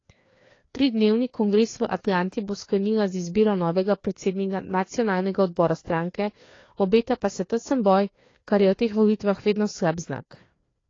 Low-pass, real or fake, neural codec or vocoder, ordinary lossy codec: 7.2 kHz; fake; codec, 16 kHz, 2 kbps, FreqCodec, larger model; AAC, 32 kbps